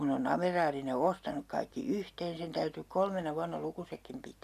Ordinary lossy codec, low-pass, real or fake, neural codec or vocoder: none; 14.4 kHz; real; none